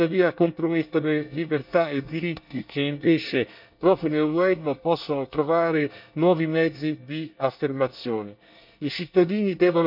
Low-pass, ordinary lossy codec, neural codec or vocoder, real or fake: 5.4 kHz; none; codec, 24 kHz, 1 kbps, SNAC; fake